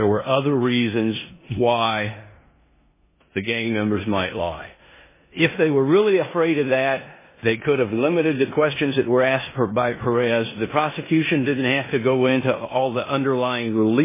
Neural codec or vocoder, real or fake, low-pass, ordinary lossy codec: codec, 16 kHz in and 24 kHz out, 0.9 kbps, LongCat-Audio-Codec, four codebook decoder; fake; 3.6 kHz; MP3, 16 kbps